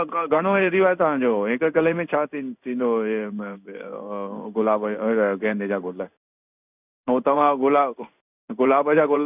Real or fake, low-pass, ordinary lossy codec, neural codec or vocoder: fake; 3.6 kHz; none; codec, 16 kHz in and 24 kHz out, 1 kbps, XY-Tokenizer